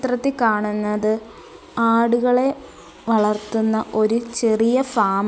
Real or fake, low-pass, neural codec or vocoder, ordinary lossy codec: real; none; none; none